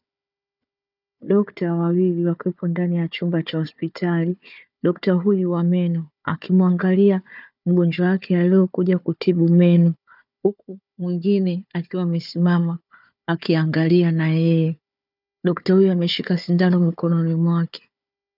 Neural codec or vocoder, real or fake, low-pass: codec, 16 kHz, 4 kbps, FunCodec, trained on Chinese and English, 50 frames a second; fake; 5.4 kHz